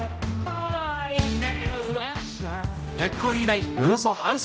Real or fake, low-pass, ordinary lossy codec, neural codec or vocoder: fake; none; none; codec, 16 kHz, 0.5 kbps, X-Codec, HuBERT features, trained on general audio